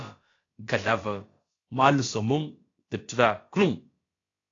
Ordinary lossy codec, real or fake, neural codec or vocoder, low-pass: AAC, 32 kbps; fake; codec, 16 kHz, about 1 kbps, DyCAST, with the encoder's durations; 7.2 kHz